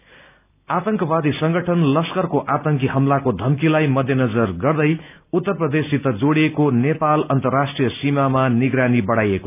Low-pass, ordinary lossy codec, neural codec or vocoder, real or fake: 3.6 kHz; none; none; real